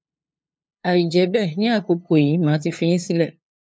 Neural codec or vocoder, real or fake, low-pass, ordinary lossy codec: codec, 16 kHz, 2 kbps, FunCodec, trained on LibriTTS, 25 frames a second; fake; none; none